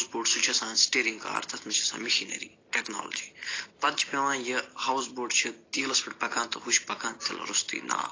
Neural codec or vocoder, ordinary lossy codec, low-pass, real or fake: none; AAC, 32 kbps; 7.2 kHz; real